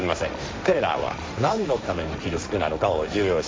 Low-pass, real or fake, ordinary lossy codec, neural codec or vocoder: none; fake; none; codec, 16 kHz, 1.1 kbps, Voila-Tokenizer